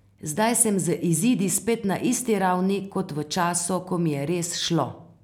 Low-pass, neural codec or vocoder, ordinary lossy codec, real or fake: 19.8 kHz; none; none; real